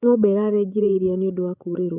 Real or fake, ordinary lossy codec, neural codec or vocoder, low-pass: fake; none; codec, 16 kHz, 16 kbps, FreqCodec, larger model; 3.6 kHz